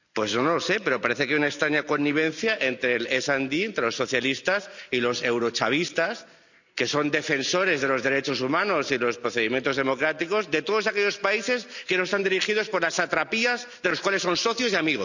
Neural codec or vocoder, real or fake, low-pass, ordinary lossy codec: none; real; 7.2 kHz; none